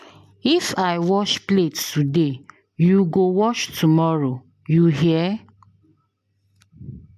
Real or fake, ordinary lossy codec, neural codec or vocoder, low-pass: real; MP3, 96 kbps; none; 14.4 kHz